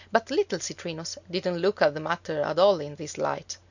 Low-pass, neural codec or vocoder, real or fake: 7.2 kHz; none; real